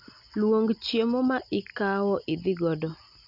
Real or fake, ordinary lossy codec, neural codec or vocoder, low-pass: real; none; none; 5.4 kHz